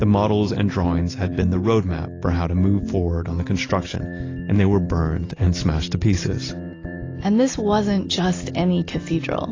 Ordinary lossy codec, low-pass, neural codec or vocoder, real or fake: AAC, 32 kbps; 7.2 kHz; none; real